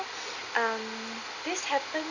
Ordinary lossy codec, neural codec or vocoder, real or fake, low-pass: none; none; real; 7.2 kHz